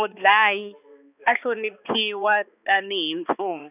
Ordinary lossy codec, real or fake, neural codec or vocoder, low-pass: none; fake; codec, 16 kHz, 2 kbps, X-Codec, HuBERT features, trained on balanced general audio; 3.6 kHz